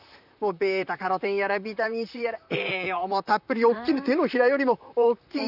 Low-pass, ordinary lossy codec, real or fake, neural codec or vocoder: 5.4 kHz; none; fake; vocoder, 44.1 kHz, 128 mel bands, Pupu-Vocoder